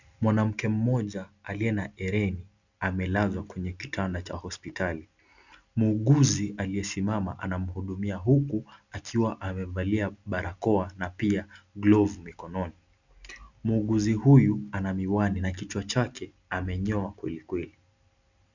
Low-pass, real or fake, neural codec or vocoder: 7.2 kHz; real; none